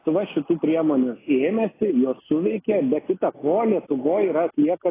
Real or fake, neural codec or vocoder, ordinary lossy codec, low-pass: real; none; AAC, 16 kbps; 3.6 kHz